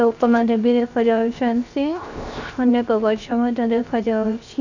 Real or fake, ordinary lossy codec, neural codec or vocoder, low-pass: fake; none; codec, 16 kHz, 0.7 kbps, FocalCodec; 7.2 kHz